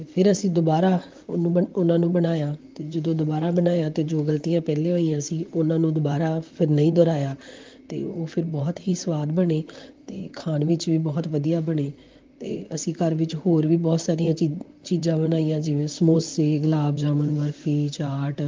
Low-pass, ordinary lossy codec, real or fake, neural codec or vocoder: 7.2 kHz; Opus, 32 kbps; fake; vocoder, 44.1 kHz, 128 mel bands, Pupu-Vocoder